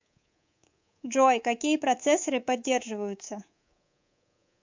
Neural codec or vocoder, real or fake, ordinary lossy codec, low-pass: codec, 24 kHz, 3.1 kbps, DualCodec; fake; MP3, 64 kbps; 7.2 kHz